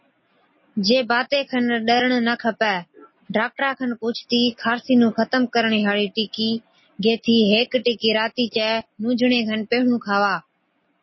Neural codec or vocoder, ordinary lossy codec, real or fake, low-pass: none; MP3, 24 kbps; real; 7.2 kHz